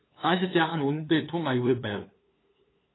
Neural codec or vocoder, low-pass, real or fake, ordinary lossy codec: codec, 16 kHz, 2 kbps, FunCodec, trained on LibriTTS, 25 frames a second; 7.2 kHz; fake; AAC, 16 kbps